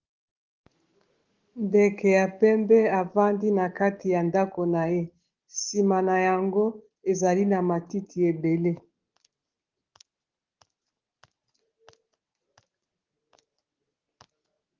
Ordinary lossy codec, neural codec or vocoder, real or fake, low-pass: Opus, 16 kbps; none; real; 7.2 kHz